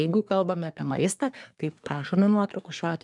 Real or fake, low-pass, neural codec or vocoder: fake; 10.8 kHz; codec, 24 kHz, 1 kbps, SNAC